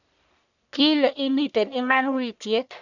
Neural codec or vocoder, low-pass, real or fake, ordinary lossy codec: codec, 44.1 kHz, 1.7 kbps, Pupu-Codec; 7.2 kHz; fake; none